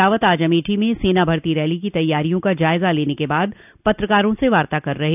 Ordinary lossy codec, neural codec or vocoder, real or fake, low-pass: none; none; real; 3.6 kHz